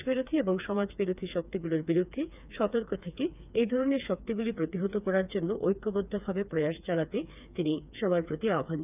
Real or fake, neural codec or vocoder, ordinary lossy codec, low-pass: fake; codec, 16 kHz, 4 kbps, FreqCodec, smaller model; none; 3.6 kHz